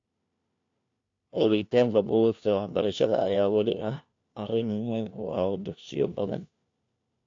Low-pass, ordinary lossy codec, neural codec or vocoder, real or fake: 7.2 kHz; AAC, 48 kbps; codec, 16 kHz, 1 kbps, FunCodec, trained on LibriTTS, 50 frames a second; fake